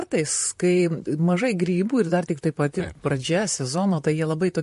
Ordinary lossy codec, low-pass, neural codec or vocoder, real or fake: MP3, 48 kbps; 14.4 kHz; vocoder, 44.1 kHz, 128 mel bands, Pupu-Vocoder; fake